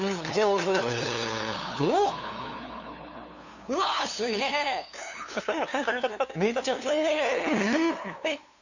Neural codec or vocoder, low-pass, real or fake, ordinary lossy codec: codec, 16 kHz, 2 kbps, FunCodec, trained on LibriTTS, 25 frames a second; 7.2 kHz; fake; none